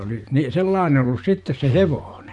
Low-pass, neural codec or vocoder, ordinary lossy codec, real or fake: 10.8 kHz; vocoder, 24 kHz, 100 mel bands, Vocos; none; fake